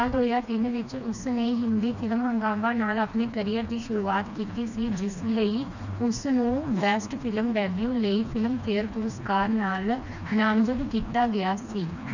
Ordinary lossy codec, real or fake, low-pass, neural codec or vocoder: none; fake; 7.2 kHz; codec, 16 kHz, 2 kbps, FreqCodec, smaller model